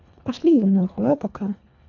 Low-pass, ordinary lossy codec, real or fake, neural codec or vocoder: 7.2 kHz; none; fake; codec, 24 kHz, 1.5 kbps, HILCodec